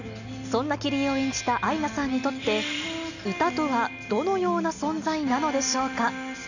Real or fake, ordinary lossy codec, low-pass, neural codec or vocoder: real; none; 7.2 kHz; none